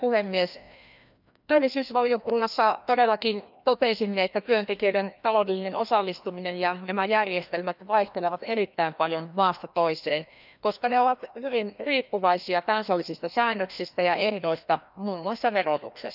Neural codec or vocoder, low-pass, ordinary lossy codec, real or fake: codec, 16 kHz, 1 kbps, FreqCodec, larger model; 5.4 kHz; none; fake